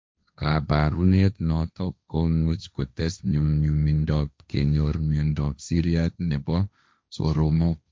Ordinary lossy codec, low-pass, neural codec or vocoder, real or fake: none; none; codec, 16 kHz, 1.1 kbps, Voila-Tokenizer; fake